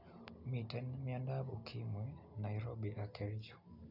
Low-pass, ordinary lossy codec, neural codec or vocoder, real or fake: 5.4 kHz; none; none; real